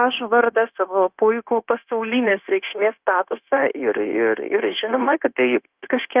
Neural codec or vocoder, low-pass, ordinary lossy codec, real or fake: codec, 16 kHz, 0.9 kbps, LongCat-Audio-Codec; 3.6 kHz; Opus, 24 kbps; fake